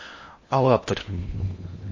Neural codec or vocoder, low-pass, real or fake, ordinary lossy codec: codec, 16 kHz in and 24 kHz out, 0.8 kbps, FocalCodec, streaming, 65536 codes; 7.2 kHz; fake; MP3, 32 kbps